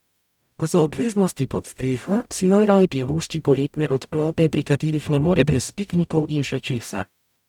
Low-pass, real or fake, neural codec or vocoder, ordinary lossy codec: 19.8 kHz; fake; codec, 44.1 kHz, 0.9 kbps, DAC; none